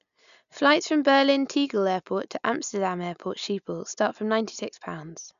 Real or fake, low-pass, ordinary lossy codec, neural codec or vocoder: real; 7.2 kHz; MP3, 96 kbps; none